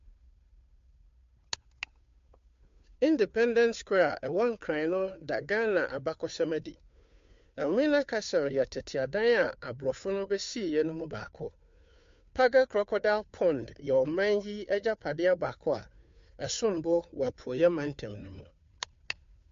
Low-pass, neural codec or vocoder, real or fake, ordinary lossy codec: 7.2 kHz; codec, 16 kHz, 2 kbps, FunCodec, trained on Chinese and English, 25 frames a second; fake; MP3, 48 kbps